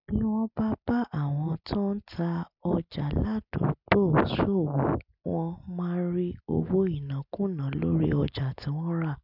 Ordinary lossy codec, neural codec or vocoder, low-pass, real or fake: none; none; 5.4 kHz; real